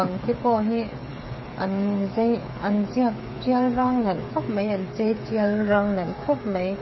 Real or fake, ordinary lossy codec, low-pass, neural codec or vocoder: fake; MP3, 24 kbps; 7.2 kHz; codec, 16 kHz, 8 kbps, FreqCodec, smaller model